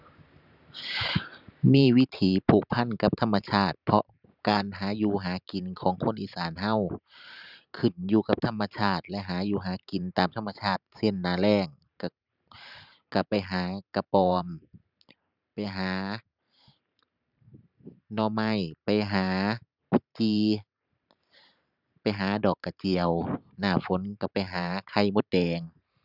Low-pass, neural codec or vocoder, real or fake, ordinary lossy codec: 5.4 kHz; vocoder, 44.1 kHz, 128 mel bands every 512 samples, BigVGAN v2; fake; none